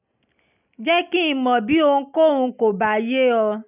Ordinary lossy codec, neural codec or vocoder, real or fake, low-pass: none; none; real; 3.6 kHz